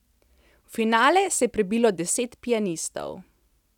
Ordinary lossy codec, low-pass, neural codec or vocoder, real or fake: none; 19.8 kHz; none; real